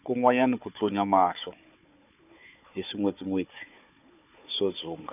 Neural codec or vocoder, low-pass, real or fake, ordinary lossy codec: codec, 16 kHz, 4 kbps, FunCodec, trained on Chinese and English, 50 frames a second; 3.6 kHz; fake; none